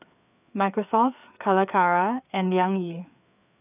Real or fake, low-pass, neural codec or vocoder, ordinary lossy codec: fake; 3.6 kHz; codec, 16 kHz, 4 kbps, FreqCodec, larger model; none